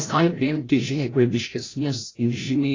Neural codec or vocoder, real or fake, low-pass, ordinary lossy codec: codec, 16 kHz, 0.5 kbps, FreqCodec, larger model; fake; 7.2 kHz; AAC, 32 kbps